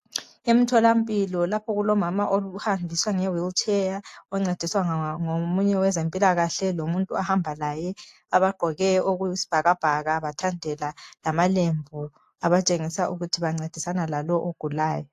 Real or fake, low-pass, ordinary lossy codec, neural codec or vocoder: real; 14.4 kHz; AAC, 64 kbps; none